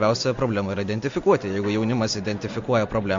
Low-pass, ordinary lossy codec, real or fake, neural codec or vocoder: 7.2 kHz; MP3, 48 kbps; real; none